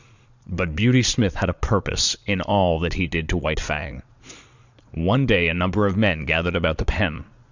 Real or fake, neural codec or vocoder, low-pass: fake; vocoder, 22.05 kHz, 80 mel bands, Vocos; 7.2 kHz